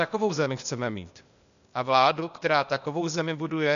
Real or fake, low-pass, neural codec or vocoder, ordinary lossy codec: fake; 7.2 kHz; codec, 16 kHz, 0.8 kbps, ZipCodec; MP3, 96 kbps